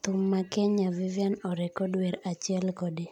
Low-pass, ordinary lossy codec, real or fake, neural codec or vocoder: 19.8 kHz; none; real; none